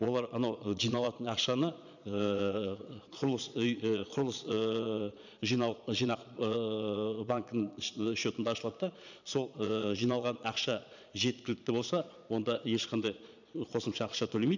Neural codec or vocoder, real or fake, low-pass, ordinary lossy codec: vocoder, 22.05 kHz, 80 mel bands, Vocos; fake; 7.2 kHz; none